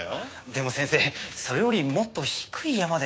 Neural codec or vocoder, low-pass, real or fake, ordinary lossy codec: codec, 16 kHz, 6 kbps, DAC; none; fake; none